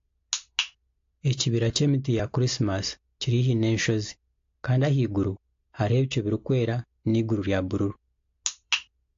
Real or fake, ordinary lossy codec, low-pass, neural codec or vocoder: real; AAC, 48 kbps; 7.2 kHz; none